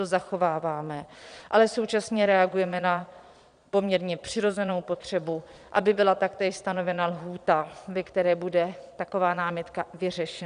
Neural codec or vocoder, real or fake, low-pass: vocoder, 22.05 kHz, 80 mel bands, Vocos; fake; 9.9 kHz